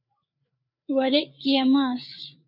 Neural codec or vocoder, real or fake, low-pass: codec, 16 kHz, 4 kbps, FreqCodec, larger model; fake; 5.4 kHz